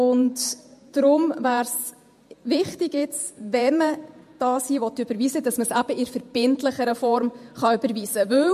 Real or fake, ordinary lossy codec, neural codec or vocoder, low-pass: fake; MP3, 64 kbps; vocoder, 48 kHz, 128 mel bands, Vocos; 14.4 kHz